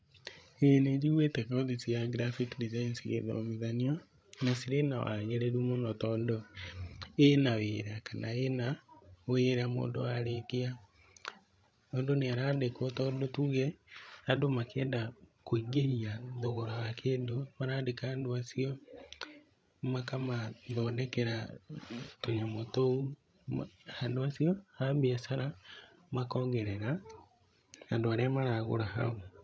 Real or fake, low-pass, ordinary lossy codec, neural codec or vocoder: fake; none; none; codec, 16 kHz, 16 kbps, FreqCodec, larger model